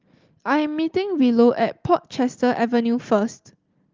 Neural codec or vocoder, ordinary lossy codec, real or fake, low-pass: none; Opus, 32 kbps; real; 7.2 kHz